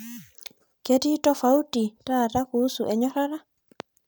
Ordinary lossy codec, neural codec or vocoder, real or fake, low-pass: none; none; real; none